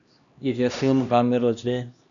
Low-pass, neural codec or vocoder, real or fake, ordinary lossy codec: 7.2 kHz; codec, 16 kHz, 1 kbps, X-Codec, HuBERT features, trained on LibriSpeech; fake; MP3, 96 kbps